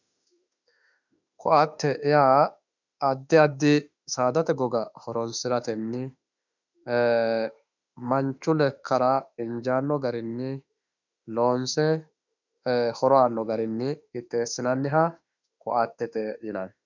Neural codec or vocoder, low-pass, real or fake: autoencoder, 48 kHz, 32 numbers a frame, DAC-VAE, trained on Japanese speech; 7.2 kHz; fake